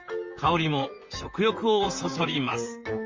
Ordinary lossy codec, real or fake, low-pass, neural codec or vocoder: Opus, 32 kbps; fake; 7.2 kHz; vocoder, 44.1 kHz, 128 mel bands, Pupu-Vocoder